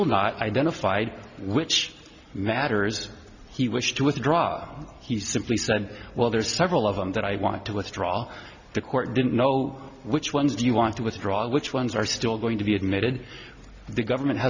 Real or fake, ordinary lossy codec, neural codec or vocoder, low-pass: real; Opus, 64 kbps; none; 7.2 kHz